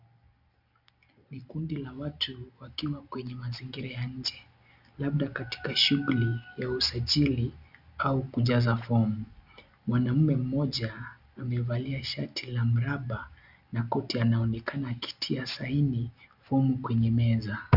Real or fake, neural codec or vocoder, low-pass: real; none; 5.4 kHz